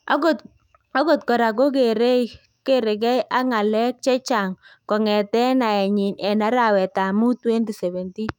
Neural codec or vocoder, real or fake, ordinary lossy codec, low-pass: autoencoder, 48 kHz, 128 numbers a frame, DAC-VAE, trained on Japanese speech; fake; none; 19.8 kHz